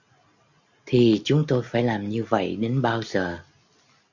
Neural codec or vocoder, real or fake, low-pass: none; real; 7.2 kHz